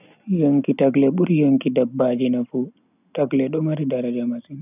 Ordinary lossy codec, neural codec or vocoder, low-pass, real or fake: none; none; 3.6 kHz; real